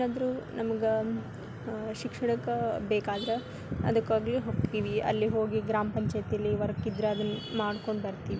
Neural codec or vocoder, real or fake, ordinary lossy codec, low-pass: none; real; none; none